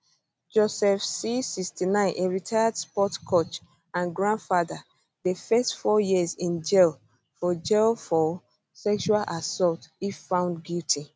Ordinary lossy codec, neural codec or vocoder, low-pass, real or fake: none; none; none; real